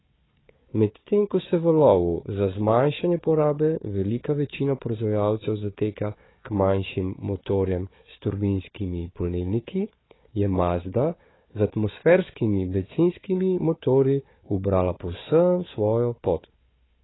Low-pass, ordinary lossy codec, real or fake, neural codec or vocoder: 7.2 kHz; AAC, 16 kbps; fake; codec, 16 kHz, 4 kbps, FunCodec, trained on Chinese and English, 50 frames a second